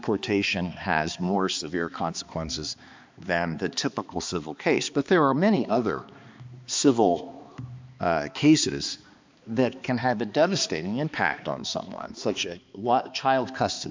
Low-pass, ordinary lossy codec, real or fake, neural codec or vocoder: 7.2 kHz; MP3, 64 kbps; fake; codec, 16 kHz, 2 kbps, X-Codec, HuBERT features, trained on balanced general audio